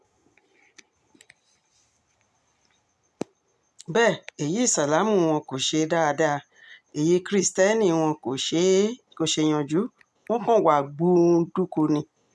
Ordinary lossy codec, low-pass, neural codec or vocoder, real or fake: none; none; none; real